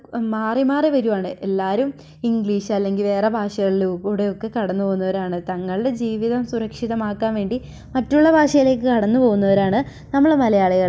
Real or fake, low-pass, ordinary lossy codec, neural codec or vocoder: real; none; none; none